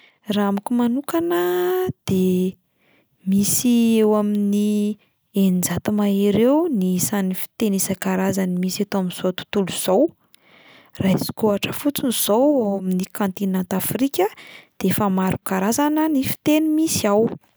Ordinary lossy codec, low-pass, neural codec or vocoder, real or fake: none; none; none; real